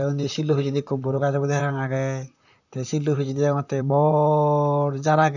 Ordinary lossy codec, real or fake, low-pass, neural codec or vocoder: none; fake; 7.2 kHz; vocoder, 44.1 kHz, 128 mel bands, Pupu-Vocoder